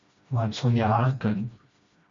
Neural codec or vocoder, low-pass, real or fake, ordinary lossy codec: codec, 16 kHz, 1 kbps, FreqCodec, smaller model; 7.2 kHz; fake; MP3, 48 kbps